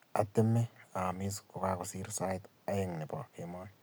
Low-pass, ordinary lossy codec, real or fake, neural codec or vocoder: none; none; real; none